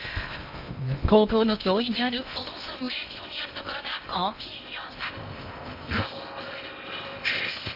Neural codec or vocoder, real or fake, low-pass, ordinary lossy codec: codec, 16 kHz in and 24 kHz out, 0.6 kbps, FocalCodec, streaming, 2048 codes; fake; 5.4 kHz; none